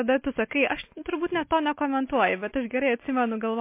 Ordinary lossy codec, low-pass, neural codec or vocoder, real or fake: MP3, 24 kbps; 3.6 kHz; none; real